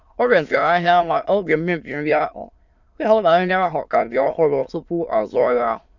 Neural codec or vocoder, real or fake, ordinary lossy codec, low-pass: autoencoder, 22.05 kHz, a latent of 192 numbers a frame, VITS, trained on many speakers; fake; none; 7.2 kHz